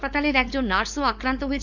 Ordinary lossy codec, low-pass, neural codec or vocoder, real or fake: none; 7.2 kHz; codec, 16 kHz, 4.8 kbps, FACodec; fake